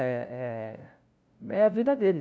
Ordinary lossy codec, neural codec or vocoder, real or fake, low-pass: none; codec, 16 kHz, 0.5 kbps, FunCodec, trained on LibriTTS, 25 frames a second; fake; none